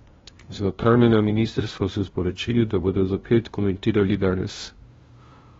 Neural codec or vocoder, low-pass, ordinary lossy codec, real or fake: codec, 16 kHz, 0.5 kbps, FunCodec, trained on LibriTTS, 25 frames a second; 7.2 kHz; AAC, 24 kbps; fake